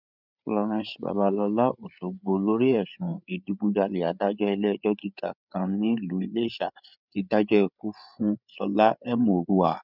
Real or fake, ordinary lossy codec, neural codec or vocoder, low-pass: fake; none; codec, 16 kHz, 8 kbps, FreqCodec, larger model; 5.4 kHz